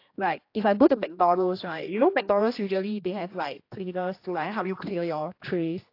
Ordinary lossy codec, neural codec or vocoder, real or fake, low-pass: AAC, 32 kbps; codec, 16 kHz, 1 kbps, X-Codec, HuBERT features, trained on general audio; fake; 5.4 kHz